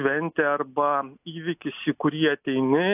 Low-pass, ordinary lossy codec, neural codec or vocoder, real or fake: 3.6 kHz; AAC, 32 kbps; none; real